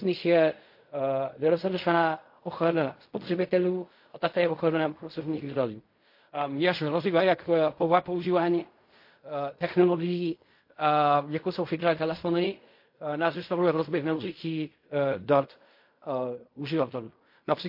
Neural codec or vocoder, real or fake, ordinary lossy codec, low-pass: codec, 16 kHz in and 24 kHz out, 0.4 kbps, LongCat-Audio-Codec, fine tuned four codebook decoder; fake; MP3, 32 kbps; 5.4 kHz